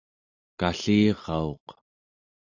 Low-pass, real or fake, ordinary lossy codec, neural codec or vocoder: 7.2 kHz; real; AAC, 48 kbps; none